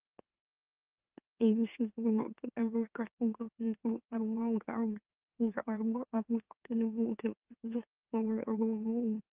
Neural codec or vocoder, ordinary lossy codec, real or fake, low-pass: autoencoder, 44.1 kHz, a latent of 192 numbers a frame, MeloTTS; Opus, 32 kbps; fake; 3.6 kHz